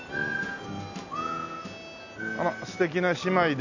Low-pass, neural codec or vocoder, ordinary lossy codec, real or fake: 7.2 kHz; none; none; real